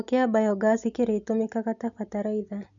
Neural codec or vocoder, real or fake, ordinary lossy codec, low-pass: none; real; none; 7.2 kHz